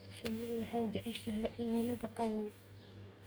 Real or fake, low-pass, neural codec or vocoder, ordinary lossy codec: fake; none; codec, 44.1 kHz, 2.6 kbps, DAC; none